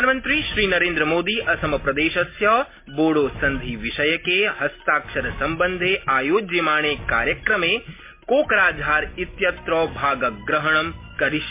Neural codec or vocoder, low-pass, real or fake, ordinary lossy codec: none; 3.6 kHz; real; MP3, 24 kbps